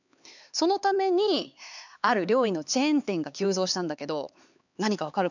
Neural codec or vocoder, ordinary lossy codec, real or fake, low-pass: codec, 16 kHz, 4 kbps, X-Codec, HuBERT features, trained on LibriSpeech; none; fake; 7.2 kHz